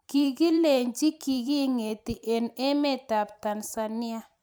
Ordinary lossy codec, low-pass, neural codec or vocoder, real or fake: none; none; vocoder, 44.1 kHz, 128 mel bands every 512 samples, BigVGAN v2; fake